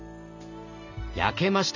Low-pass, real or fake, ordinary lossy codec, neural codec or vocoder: 7.2 kHz; real; none; none